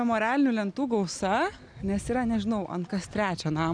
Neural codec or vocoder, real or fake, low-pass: none; real; 9.9 kHz